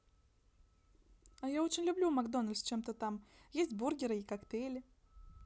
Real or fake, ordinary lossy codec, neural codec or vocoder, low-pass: real; none; none; none